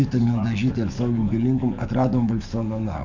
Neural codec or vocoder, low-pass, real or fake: codec, 44.1 kHz, 7.8 kbps, DAC; 7.2 kHz; fake